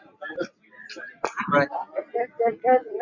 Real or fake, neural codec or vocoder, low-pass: real; none; 7.2 kHz